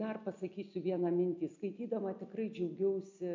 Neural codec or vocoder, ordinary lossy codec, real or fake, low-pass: none; MP3, 64 kbps; real; 7.2 kHz